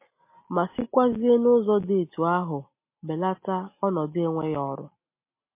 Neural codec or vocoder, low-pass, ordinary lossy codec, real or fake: none; 3.6 kHz; MP3, 24 kbps; real